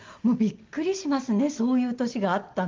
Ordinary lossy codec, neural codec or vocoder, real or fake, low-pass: Opus, 32 kbps; none; real; 7.2 kHz